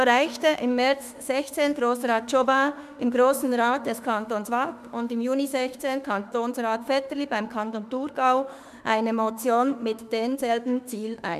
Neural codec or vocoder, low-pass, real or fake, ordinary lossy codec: autoencoder, 48 kHz, 32 numbers a frame, DAC-VAE, trained on Japanese speech; 14.4 kHz; fake; none